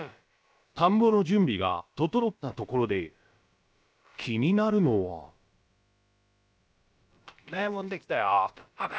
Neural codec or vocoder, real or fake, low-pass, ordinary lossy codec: codec, 16 kHz, about 1 kbps, DyCAST, with the encoder's durations; fake; none; none